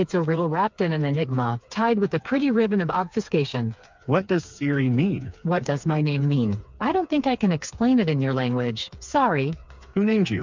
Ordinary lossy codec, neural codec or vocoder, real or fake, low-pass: MP3, 64 kbps; codec, 16 kHz, 4 kbps, FreqCodec, smaller model; fake; 7.2 kHz